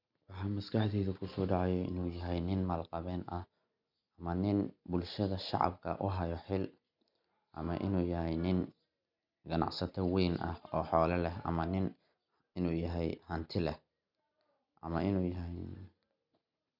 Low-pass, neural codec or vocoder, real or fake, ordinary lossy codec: 5.4 kHz; none; real; none